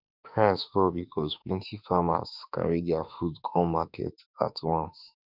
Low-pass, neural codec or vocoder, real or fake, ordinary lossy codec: 5.4 kHz; autoencoder, 48 kHz, 32 numbers a frame, DAC-VAE, trained on Japanese speech; fake; none